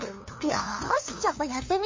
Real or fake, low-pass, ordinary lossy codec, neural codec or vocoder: fake; 7.2 kHz; MP3, 32 kbps; codec, 16 kHz, 2 kbps, FunCodec, trained on LibriTTS, 25 frames a second